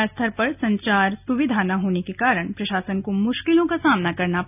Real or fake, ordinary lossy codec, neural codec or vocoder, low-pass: real; none; none; 3.6 kHz